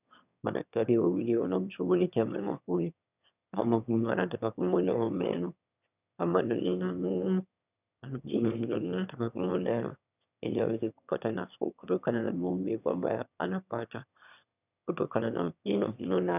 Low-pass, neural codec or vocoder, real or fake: 3.6 kHz; autoencoder, 22.05 kHz, a latent of 192 numbers a frame, VITS, trained on one speaker; fake